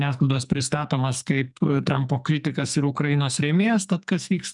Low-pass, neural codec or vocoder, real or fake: 10.8 kHz; codec, 44.1 kHz, 2.6 kbps, SNAC; fake